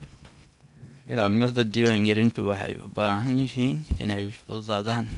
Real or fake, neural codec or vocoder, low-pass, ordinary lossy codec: fake; codec, 16 kHz in and 24 kHz out, 0.8 kbps, FocalCodec, streaming, 65536 codes; 10.8 kHz; none